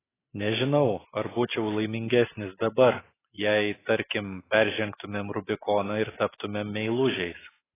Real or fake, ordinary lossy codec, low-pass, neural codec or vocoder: real; AAC, 16 kbps; 3.6 kHz; none